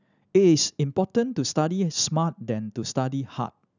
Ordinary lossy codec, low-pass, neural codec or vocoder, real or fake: none; 7.2 kHz; none; real